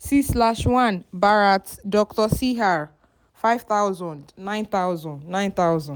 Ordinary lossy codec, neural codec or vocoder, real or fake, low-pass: none; none; real; none